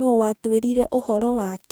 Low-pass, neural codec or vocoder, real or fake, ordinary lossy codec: none; codec, 44.1 kHz, 2.6 kbps, DAC; fake; none